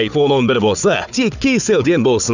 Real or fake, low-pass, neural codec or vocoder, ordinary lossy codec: fake; 7.2 kHz; codec, 16 kHz, 4 kbps, FunCodec, trained on Chinese and English, 50 frames a second; none